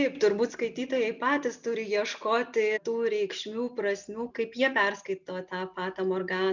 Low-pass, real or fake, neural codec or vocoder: 7.2 kHz; real; none